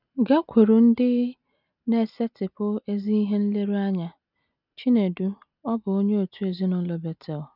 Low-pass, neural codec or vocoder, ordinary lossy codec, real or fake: 5.4 kHz; none; none; real